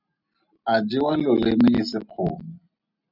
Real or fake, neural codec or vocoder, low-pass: real; none; 5.4 kHz